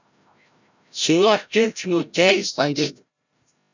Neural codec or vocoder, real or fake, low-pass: codec, 16 kHz, 0.5 kbps, FreqCodec, larger model; fake; 7.2 kHz